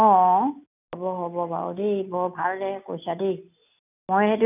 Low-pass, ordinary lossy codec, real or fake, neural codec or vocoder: 3.6 kHz; none; real; none